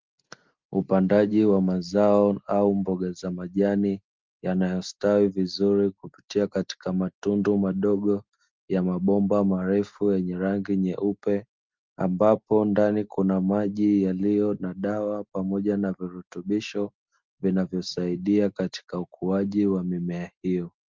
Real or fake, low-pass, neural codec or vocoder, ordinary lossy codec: real; 7.2 kHz; none; Opus, 32 kbps